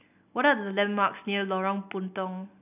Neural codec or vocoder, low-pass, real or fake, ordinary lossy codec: none; 3.6 kHz; real; none